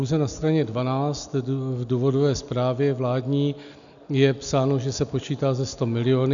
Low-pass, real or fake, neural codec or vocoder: 7.2 kHz; real; none